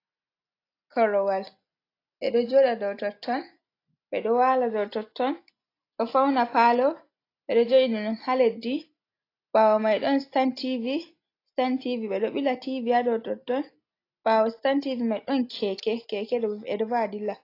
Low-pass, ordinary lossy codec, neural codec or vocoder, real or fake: 5.4 kHz; AAC, 32 kbps; none; real